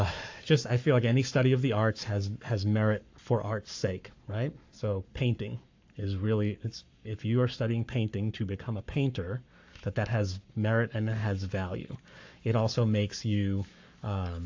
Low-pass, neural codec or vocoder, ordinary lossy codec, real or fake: 7.2 kHz; codec, 44.1 kHz, 7.8 kbps, Pupu-Codec; AAC, 48 kbps; fake